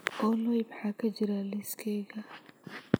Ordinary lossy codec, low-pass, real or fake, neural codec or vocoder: none; none; fake; vocoder, 44.1 kHz, 128 mel bands every 256 samples, BigVGAN v2